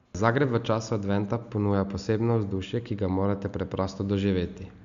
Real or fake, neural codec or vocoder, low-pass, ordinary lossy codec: real; none; 7.2 kHz; none